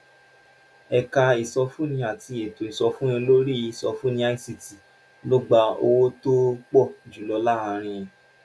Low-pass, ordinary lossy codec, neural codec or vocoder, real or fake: none; none; none; real